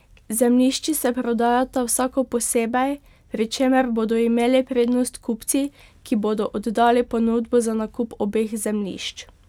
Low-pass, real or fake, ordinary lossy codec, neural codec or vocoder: 19.8 kHz; real; none; none